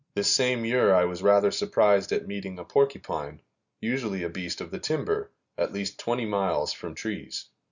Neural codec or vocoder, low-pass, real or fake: none; 7.2 kHz; real